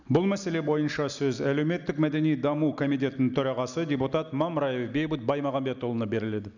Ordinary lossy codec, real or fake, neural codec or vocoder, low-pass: none; real; none; 7.2 kHz